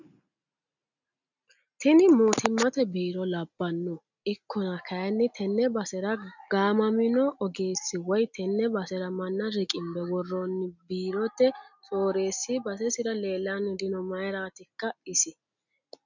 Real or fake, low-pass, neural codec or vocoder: real; 7.2 kHz; none